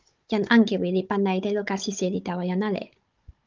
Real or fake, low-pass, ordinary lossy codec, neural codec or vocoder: fake; 7.2 kHz; Opus, 32 kbps; codec, 16 kHz, 16 kbps, FunCodec, trained on Chinese and English, 50 frames a second